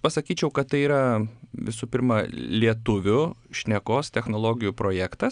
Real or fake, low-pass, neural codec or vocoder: real; 9.9 kHz; none